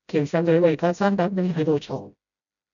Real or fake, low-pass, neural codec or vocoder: fake; 7.2 kHz; codec, 16 kHz, 0.5 kbps, FreqCodec, smaller model